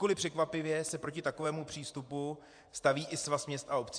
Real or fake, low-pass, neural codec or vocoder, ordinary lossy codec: fake; 9.9 kHz; vocoder, 24 kHz, 100 mel bands, Vocos; AAC, 64 kbps